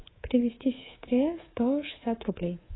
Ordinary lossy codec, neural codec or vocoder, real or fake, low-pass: AAC, 16 kbps; codec, 24 kHz, 3.1 kbps, DualCodec; fake; 7.2 kHz